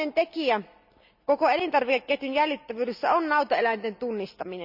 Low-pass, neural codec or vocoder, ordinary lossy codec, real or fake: 5.4 kHz; none; none; real